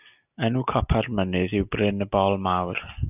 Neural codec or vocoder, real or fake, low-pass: none; real; 3.6 kHz